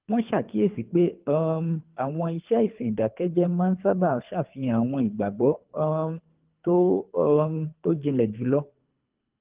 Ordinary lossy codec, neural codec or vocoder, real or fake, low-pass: Opus, 24 kbps; codec, 24 kHz, 3 kbps, HILCodec; fake; 3.6 kHz